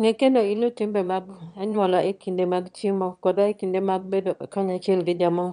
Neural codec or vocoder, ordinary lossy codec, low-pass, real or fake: autoencoder, 22.05 kHz, a latent of 192 numbers a frame, VITS, trained on one speaker; MP3, 96 kbps; 9.9 kHz; fake